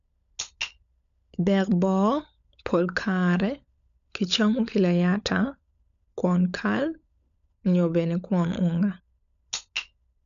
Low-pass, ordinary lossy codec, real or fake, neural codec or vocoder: 7.2 kHz; none; fake; codec, 16 kHz, 16 kbps, FunCodec, trained on LibriTTS, 50 frames a second